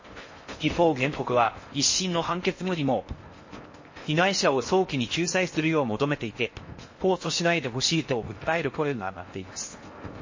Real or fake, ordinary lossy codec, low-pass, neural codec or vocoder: fake; MP3, 32 kbps; 7.2 kHz; codec, 16 kHz in and 24 kHz out, 0.6 kbps, FocalCodec, streaming, 4096 codes